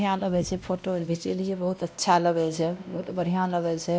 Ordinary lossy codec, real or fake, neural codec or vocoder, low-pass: none; fake; codec, 16 kHz, 1 kbps, X-Codec, WavLM features, trained on Multilingual LibriSpeech; none